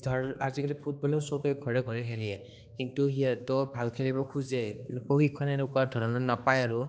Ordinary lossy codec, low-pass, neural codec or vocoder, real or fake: none; none; codec, 16 kHz, 2 kbps, X-Codec, HuBERT features, trained on balanced general audio; fake